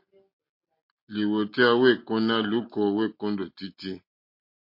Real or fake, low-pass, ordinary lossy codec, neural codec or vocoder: real; 5.4 kHz; MP3, 32 kbps; none